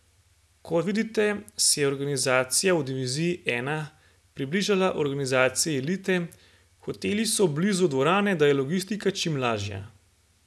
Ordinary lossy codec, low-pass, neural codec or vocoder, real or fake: none; none; none; real